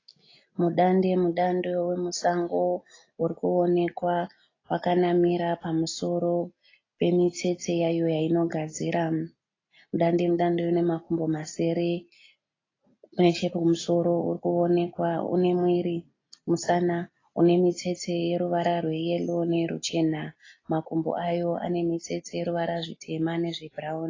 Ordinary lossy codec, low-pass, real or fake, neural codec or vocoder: AAC, 32 kbps; 7.2 kHz; real; none